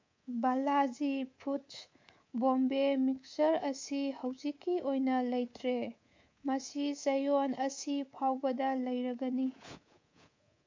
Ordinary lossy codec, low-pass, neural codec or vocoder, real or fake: MP3, 48 kbps; 7.2 kHz; codec, 24 kHz, 3.1 kbps, DualCodec; fake